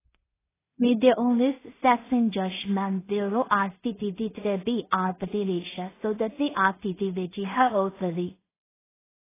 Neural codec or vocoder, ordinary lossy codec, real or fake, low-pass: codec, 16 kHz in and 24 kHz out, 0.4 kbps, LongCat-Audio-Codec, two codebook decoder; AAC, 16 kbps; fake; 3.6 kHz